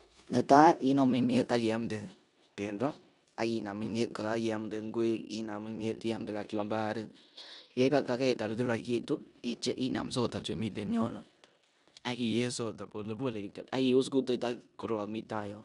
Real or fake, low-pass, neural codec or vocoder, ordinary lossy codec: fake; 10.8 kHz; codec, 16 kHz in and 24 kHz out, 0.9 kbps, LongCat-Audio-Codec, four codebook decoder; none